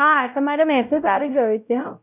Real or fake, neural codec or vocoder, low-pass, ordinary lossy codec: fake; codec, 16 kHz, 0.5 kbps, X-Codec, WavLM features, trained on Multilingual LibriSpeech; 3.6 kHz; none